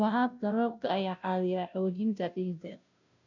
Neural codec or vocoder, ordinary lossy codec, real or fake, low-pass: codec, 16 kHz, 0.5 kbps, FunCodec, trained on Chinese and English, 25 frames a second; none; fake; 7.2 kHz